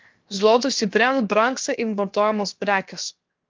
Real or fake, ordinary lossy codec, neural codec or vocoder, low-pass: fake; Opus, 24 kbps; codec, 16 kHz, 0.7 kbps, FocalCodec; 7.2 kHz